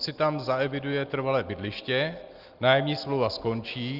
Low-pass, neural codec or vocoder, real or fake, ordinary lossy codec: 5.4 kHz; none; real; Opus, 32 kbps